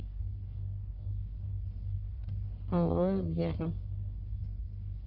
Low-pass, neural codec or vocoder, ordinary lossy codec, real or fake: 5.4 kHz; codec, 44.1 kHz, 1.7 kbps, Pupu-Codec; none; fake